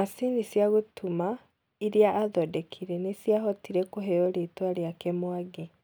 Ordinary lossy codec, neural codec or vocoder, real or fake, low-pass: none; none; real; none